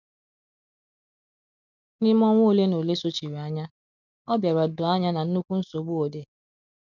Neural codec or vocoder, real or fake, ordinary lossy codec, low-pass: none; real; none; 7.2 kHz